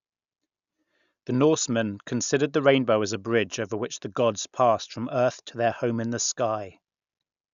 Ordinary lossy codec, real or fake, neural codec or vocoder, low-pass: none; real; none; 7.2 kHz